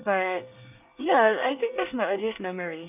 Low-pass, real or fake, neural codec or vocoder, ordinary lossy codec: 3.6 kHz; fake; codec, 24 kHz, 1 kbps, SNAC; none